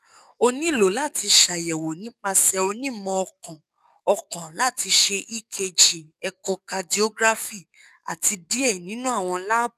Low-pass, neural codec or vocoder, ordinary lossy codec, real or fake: 14.4 kHz; codec, 44.1 kHz, 7.8 kbps, DAC; none; fake